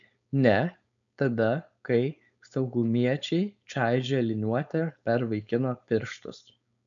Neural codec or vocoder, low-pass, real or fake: codec, 16 kHz, 4.8 kbps, FACodec; 7.2 kHz; fake